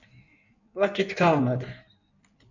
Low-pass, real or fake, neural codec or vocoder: 7.2 kHz; fake; codec, 16 kHz in and 24 kHz out, 1.1 kbps, FireRedTTS-2 codec